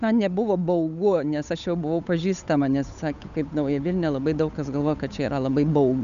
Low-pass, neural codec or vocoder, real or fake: 7.2 kHz; codec, 16 kHz, 8 kbps, FunCodec, trained on Chinese and English, 25 frames a second; fake